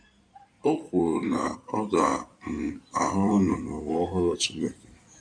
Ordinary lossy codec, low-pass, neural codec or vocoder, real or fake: none; 9.9 kHz; codec, 16 kHz in and 24 kHz out, 2.2 kbps, FireRedTTS-2 codec; fake